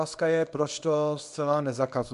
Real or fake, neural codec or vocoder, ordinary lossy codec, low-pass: fake; codec, 24 kHz, 0.9 kbps, WavTokenizer, small release; MP3, 64 kbps; 10.8 kHz